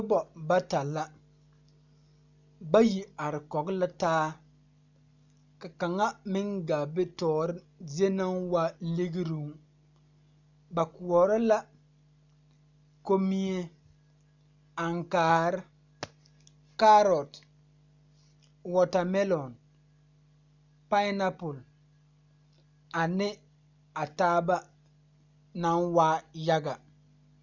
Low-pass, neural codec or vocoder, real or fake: 7.2 kHz; none; real